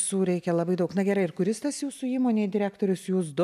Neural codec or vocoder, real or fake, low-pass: none; real; 14.4 kHz